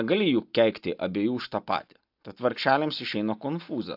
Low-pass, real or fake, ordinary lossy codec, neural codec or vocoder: 5.4 kHz; real; AAC, 48 kbps; none